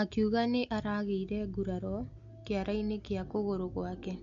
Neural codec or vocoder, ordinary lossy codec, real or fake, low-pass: none; MP3, 48 kbps; real; 7.2 kHz